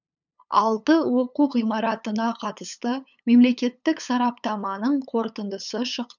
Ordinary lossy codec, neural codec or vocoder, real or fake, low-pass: none; codec, 16 kHz, 8 kbps, FunCodec, trained on LibriTTS, 25 frames a second; fake; 7.2 kHz